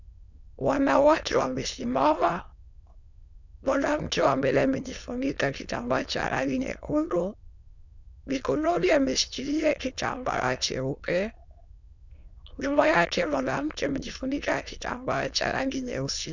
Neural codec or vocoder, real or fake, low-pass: autoencoder, 22.05 kHz, a latent of 192 numbers a frame, VITS, trained on many speakers; fake; 7.2 kHz